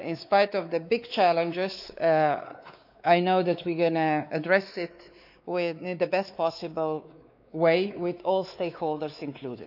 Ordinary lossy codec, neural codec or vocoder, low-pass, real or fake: none; codec, 16 kHz, 4 kbps, X-Codec, WavLM features, trained on Multilingual LibriSpeech; 5.4 kHz; fake